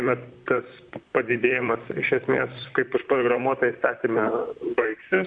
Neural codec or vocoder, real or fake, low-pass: vocoder, 44.1 kHz, 128 mel bands, Pupu-Vocoder; fake; 9.9 kHz